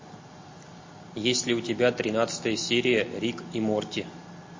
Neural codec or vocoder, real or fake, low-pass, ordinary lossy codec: none; real; 7.2 kHz; MP3, 32 kbps